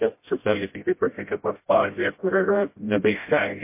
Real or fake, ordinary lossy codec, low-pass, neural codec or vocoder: fake; MP3, 24 kbps; 3.6 kHz; codec, 16 kHz, 0.5 kbps, FreqCodec, smaller model